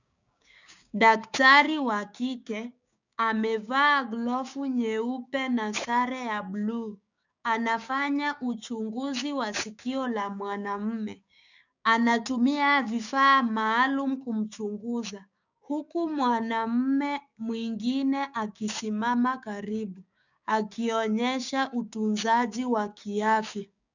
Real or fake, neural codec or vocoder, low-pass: fake; codec, 16 kHz, 6 kbps, DAC; 7.2 kHz